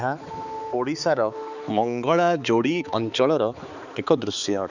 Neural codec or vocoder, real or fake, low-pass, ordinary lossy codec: codec, 16 kHz, 4 kbps, X-Codec, HuBERT features, trained on balanced general audio; fake; 7.2 kHz; none